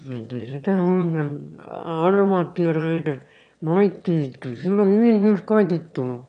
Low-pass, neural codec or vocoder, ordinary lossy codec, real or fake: 9.9 kHz; autoencoder, 22.05 kHz, a latent of 192 numbers a frame, VITS, trained on one speaker; none; fake